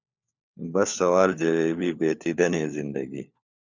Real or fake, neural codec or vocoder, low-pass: fake; codec, 16 kHz, 4 kbps, FunCodec, trained on LibriTTS, 50 frames a second; 7.2 kHz